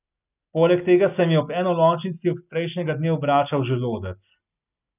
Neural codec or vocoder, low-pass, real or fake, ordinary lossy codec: none; 3.6 kHz; real; none